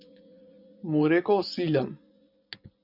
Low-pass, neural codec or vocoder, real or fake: 5.4 kHz; vocoder, 24 kHz, 100 mel bands, Vocos; fake